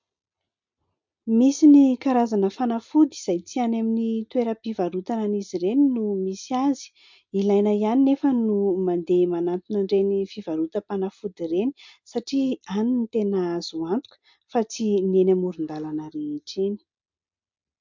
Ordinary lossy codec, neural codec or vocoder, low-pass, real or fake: MP3, 64 kbps; none; 7.2 kHz; real